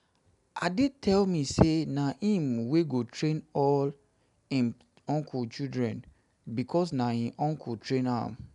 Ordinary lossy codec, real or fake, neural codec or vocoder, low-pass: none; real; none; 10.8 kHz